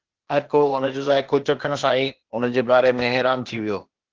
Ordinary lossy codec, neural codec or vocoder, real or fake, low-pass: Opus, 16 kbps; codec, 16 kHz, 0.8 kbps, ZipCodec; fake; 7.2 kHz